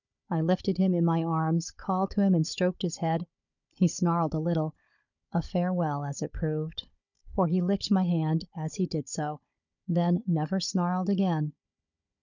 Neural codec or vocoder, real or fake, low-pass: codec, 16 kHz, 16 kbps, FunCodec, trained on Chinese and English, 50 frames a second; fake; 7.2 kHz